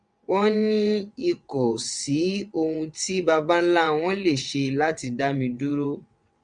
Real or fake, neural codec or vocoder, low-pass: fake; vocoder, 22.05 kHz, 80 mel bands, WaveNeXt; 9.9 kHz